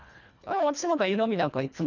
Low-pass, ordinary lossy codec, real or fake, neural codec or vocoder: 7.2 kHz; none; fake; codec, 24 kHz, 1.5 kbps, HILCodec